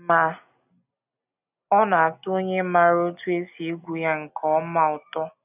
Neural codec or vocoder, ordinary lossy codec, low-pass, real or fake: none; none; 3.6 kHz; real